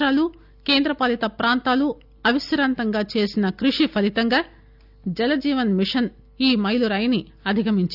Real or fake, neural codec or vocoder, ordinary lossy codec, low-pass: real; none; none; 5.4 kHz